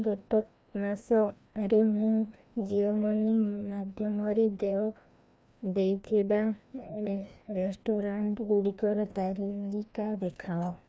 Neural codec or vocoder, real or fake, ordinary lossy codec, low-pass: codec, 16 kHz, 1 kbps, FreqCodec, larger model; fake; none; none